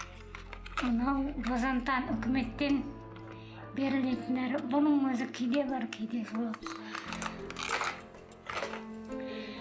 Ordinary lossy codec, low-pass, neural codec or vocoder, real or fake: none; none; none; real